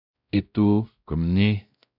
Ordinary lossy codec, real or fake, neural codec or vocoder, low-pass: none; fake; codec, 16 kHz, 0.5 kbps, X-Codec, WavLM features, trained on Multilingual LibriSpeech; 5.4 kHz